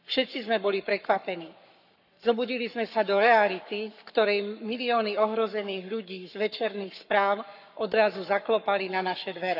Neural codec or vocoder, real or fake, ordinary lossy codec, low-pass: codec, 44.1 kHz, 7.8 kbps, Pupu-Codec; fake; none; 5.4 kHz